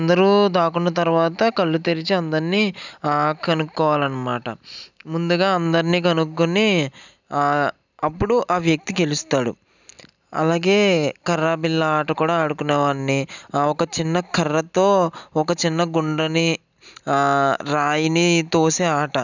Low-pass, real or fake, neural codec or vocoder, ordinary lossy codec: 7.2 kHz; real; none; none